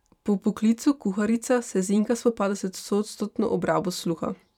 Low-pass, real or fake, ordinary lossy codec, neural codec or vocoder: 19.8 kHz; fake; none; vocoder, 44.1 kHz, 128 mel bands every 512 samples, BigVGAN v2